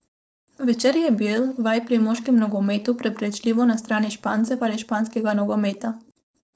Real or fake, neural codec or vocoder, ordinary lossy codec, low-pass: fake; codec, 16 kHz, 4.8 kbps, FACodec; none; none